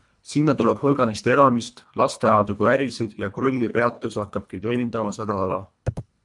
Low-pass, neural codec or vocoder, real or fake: 10.8 kHz; codec, 24 kHz, 1.5 kbps, HILCodec; fake